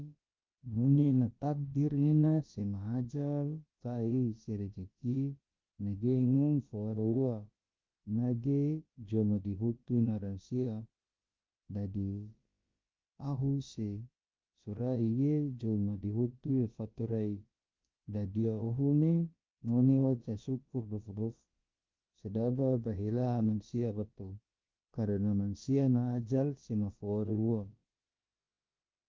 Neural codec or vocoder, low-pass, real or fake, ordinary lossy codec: codec, 16 kHz, about 1 kbps, DyCAST, with the encoder's durations; 7.2 kHz; fake; Opus, 32 kbps